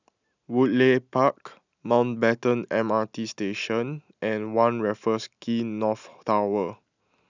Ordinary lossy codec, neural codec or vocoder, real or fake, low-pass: none; none; real; 7.2 kHz